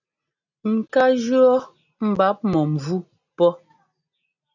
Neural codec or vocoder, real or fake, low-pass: none; real; 7.2 kHz